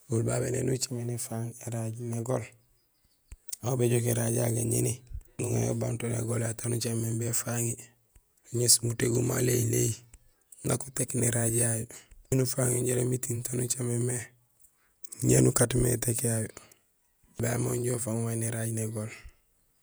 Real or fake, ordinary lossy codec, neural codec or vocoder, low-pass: fake; none; vocoder, 48 kHz, 128 mel bands, Vocos; none